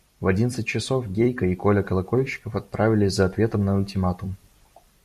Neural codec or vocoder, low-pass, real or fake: vocoder, 44.1 kHz, 128 mel bands every 256 samples, BigVGAN v2; 14.4 kHz; fake